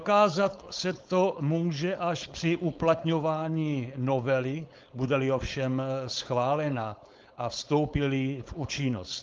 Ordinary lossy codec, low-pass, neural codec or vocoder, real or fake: Opus, 32 kbps; 7.2 kHz; codec, 16 kHz, 4.8 kbps, FACodec; fake